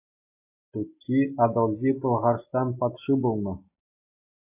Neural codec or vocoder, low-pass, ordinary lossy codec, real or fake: none; 3.6 kHz; AAC, 32 kbps; real